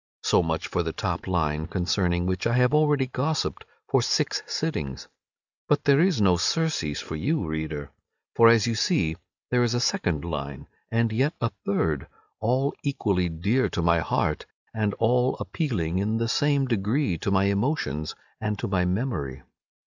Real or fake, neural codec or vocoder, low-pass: real; none; 7.2 kHz